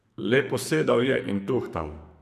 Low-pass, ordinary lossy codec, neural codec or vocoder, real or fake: 14.4 kHz; none; codec, 44.1 kHz, 2.6 kbps, SNAC; fake